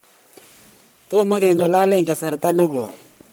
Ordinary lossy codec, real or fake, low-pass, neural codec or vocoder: none; fake; none; codec, 44.1 kHz, 1.7 kbps, Pupu-Codec